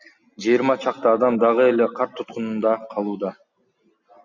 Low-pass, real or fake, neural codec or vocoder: 7.2 kHz; real; none